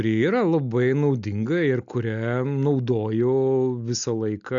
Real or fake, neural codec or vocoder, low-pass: real; none; 7.2 kHz